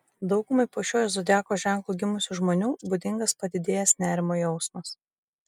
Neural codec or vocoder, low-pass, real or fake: none; 19.8 kHz; real